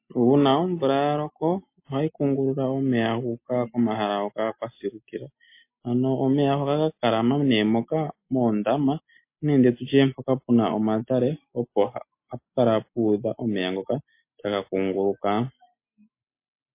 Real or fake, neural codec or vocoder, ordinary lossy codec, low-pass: real; none; MP3, 24 kbps; 3.6 kHz